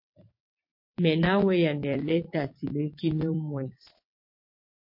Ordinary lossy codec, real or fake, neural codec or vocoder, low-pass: MP3, 32 kbps; fake; vocoder, 22.05 kHz, 80 mel bands, WaveNeXt; 5.4 kHz